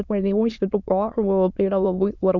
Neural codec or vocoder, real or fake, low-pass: autoencoder, 22.05 kHz, a latent of 192 numbers a frame, VITS, trained on many speakers; fake; 7.2 kHz